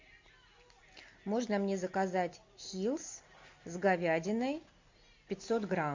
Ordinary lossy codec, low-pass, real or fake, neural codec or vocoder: MP3, 48 kbps; 7.2 kHz; real; none